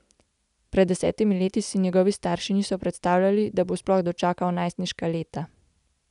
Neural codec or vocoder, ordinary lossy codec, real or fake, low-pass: none; none; real; 10.8 kHz